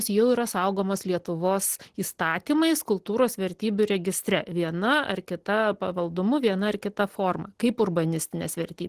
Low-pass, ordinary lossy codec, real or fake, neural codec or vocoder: 14.4 kHz; Opus, 16 kbps; real; none